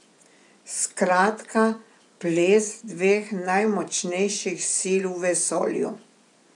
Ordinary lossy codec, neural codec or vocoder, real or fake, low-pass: none; vocoder, 24 kHz, 100 mel bands, Vocos; fake; 10.8 kHz